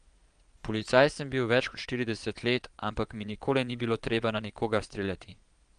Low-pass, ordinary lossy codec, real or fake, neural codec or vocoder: 9.9 kHz; Opus, 32 kbps; fake; vocoder, 22.05 kHz, 80 mel bands, Vocos